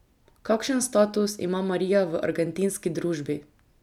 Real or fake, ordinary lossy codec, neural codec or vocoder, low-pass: real; none; none; 19.8 kHz